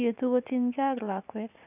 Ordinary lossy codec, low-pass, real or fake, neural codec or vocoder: none; 3.6 kHz; fake; vocoder, 44.1 kHz, 80 mel bands, Vocos